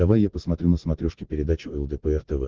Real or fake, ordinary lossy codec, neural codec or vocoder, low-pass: real; Opus, 16 kbps; none; 7.2 kHz